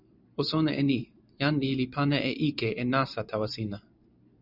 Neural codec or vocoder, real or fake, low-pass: vocoder, 44.1 kHz, 128 mel bands every 512 samples, BigVGAN v2; fake; 5.4 kHz